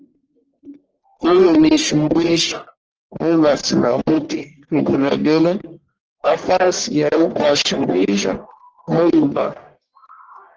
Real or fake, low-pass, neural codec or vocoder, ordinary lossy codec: fake; 7.2 kHz; codec, 44.1 kHz, 1.7 kbps, Pupu-Codec; Opus, 16 kbps